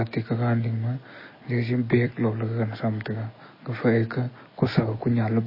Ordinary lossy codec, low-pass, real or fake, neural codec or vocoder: MP3, 24 kbps; 5.4 kHz; real; none